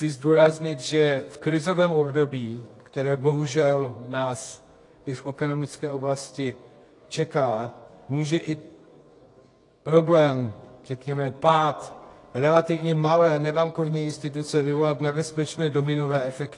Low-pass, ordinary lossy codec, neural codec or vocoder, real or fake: 10.8 kHz; AAC, 48 kbps; codec, 24 kHz, 0.9 kbps, WavTokenizer, medium music audio release; fake